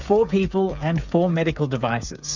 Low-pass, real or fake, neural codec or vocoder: 7.2 kHz; fake; codec, 16 kHz, 8 kbps, FreqCodec, smaller model